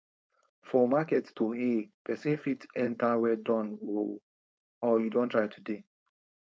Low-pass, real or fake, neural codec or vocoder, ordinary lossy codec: none; fake; codec, 16 kHz, 4.8 kbps, FACodec; none